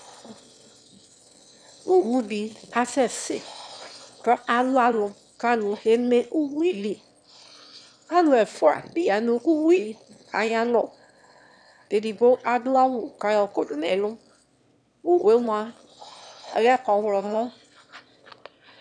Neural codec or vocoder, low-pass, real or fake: autoencoder, 22.05 kHz, a latent of 192 numbers a frame, VITS, trained on one speaker; 9.9 kHz; fake